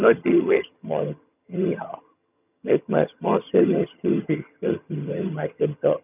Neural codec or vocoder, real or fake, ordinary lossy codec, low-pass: vocoder, 22.05 kHz, 80 mel bands, HiFi-GAN; fake; none; 3.6 kHz